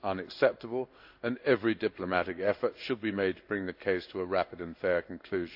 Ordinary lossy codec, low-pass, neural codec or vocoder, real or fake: AAC, 48 kbps; 5.4 kHz; codec, 16 kHz in and 24 kHz out, 1 kbps, XY-Tokenizer; fake